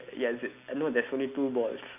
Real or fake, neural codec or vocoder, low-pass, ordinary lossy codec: real; none; 3.6 kHz; none